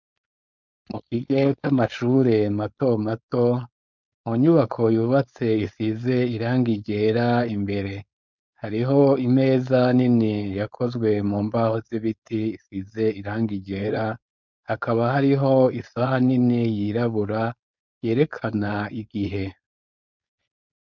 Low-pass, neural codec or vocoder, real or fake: 7.2 kHz; codec, 16 kHz, 4.8 kbps, FACodec; fake